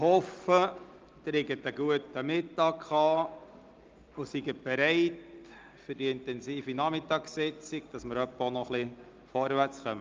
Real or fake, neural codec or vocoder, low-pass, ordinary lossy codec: real; none; 7.2 kHz; Opus, 24 kbps